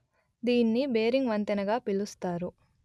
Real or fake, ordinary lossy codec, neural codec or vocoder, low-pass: real; none; none; none